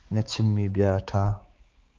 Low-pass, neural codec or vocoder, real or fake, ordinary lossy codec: 7.2 kHz; codec, 16 kHz, 4 kbps, X-Codec, HuBERT features, trained on balanced general audio; fake; Opus, 24 kbps